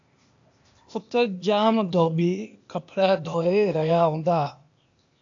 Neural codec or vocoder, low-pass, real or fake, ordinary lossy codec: codec, 16 kHz, 0.8 kbps, ZipCodec; 7.2 kHz; fake; AAC, 64 kbps